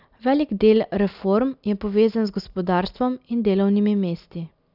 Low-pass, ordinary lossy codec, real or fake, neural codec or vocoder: 5.4 kHz; none; real; none